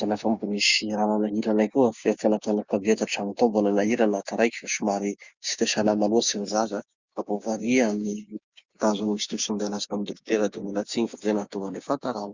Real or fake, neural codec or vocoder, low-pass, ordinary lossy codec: fake; codec, 16 kHz, 6 kbps, DAC; 7.2 kHz; Opus, 64 kbps